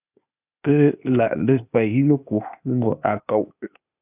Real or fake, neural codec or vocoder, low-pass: fake; codec, 16 kHz, 0.8 kbps, ZipCodec; 3.6 kHz